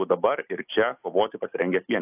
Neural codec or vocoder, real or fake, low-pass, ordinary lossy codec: none; real; 3.6 kHz; AAC, 24 kbps